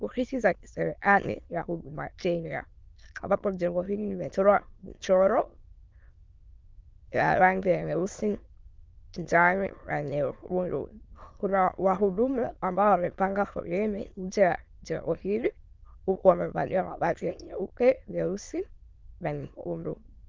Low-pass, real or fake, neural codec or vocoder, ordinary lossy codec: 7.2 kHz; fake; autoencoder, 22.05 kHz, a latent of 192 numbers a frame, VITS, trained on many speakers; Opus, 32 kbps